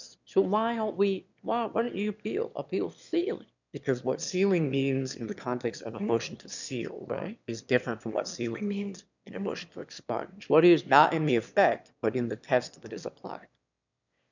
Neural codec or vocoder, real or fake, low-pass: autoencoder, 22.05 kHz, a latent of 192 numbers a frame, VITS, trained on one speaker; fake; 7.2 kHz